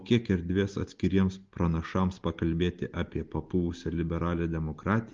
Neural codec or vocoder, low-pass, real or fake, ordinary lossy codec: none; 7.2 kHz; real; Opus, 32 kbps